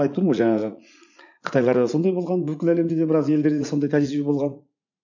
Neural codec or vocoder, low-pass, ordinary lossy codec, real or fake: vocoder, 44.1 kHz, 80 mel bands, Vocos; 7.2 kHz; none; fake